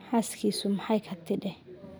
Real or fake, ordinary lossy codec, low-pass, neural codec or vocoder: real; none; none; none